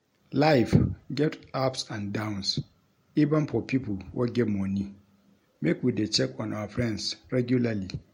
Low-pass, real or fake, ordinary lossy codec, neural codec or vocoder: 19.8 kHz; real; MP3, 64 kbps; none